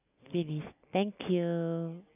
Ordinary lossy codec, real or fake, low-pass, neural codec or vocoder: none; real; 3.6 kHz; none